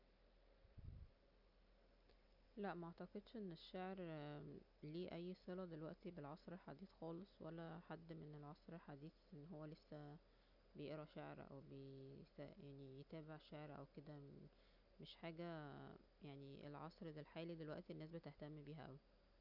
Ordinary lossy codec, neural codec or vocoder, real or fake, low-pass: none; none; real; 5.4 kHz